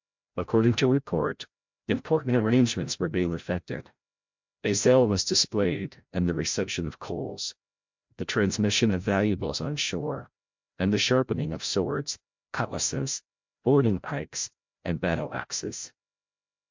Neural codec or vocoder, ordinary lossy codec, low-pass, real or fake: codec, 16 kHz, 0.5 kbps, FreqCodec, larger model; MP3, 64 kbps; 7.2 kHz; fake